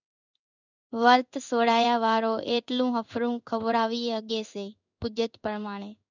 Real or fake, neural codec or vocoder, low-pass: fake; codec, 16 kHz in and 24 kHz out, 1 kbps, XY-Tokenizer; 7.2 kHz